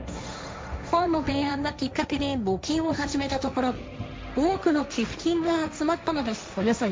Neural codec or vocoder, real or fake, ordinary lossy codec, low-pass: codec, 16 kHz, 1.1 kbps, Voila-Tokenizer; fake; none; none